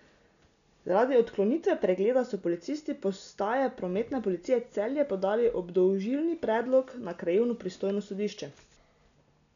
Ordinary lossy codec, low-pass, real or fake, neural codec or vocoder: none; 7.2 kHz; real; none